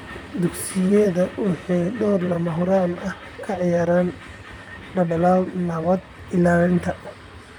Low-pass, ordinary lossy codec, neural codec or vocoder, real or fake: 19.8 kHz; none; vocoder, 44.1 kHz, 128 mel bands, Pupu-Vocoder; fake